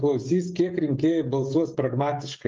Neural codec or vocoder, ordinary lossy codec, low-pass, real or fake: codec, 16 kHz, 16 kbps, FreqCodec, smaller model; Opus, 32 kbps; 7.2 kHz; fake